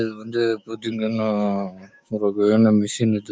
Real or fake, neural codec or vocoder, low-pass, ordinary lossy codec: fake; codec, 16 kHz, 6 kbps, DAC; none; none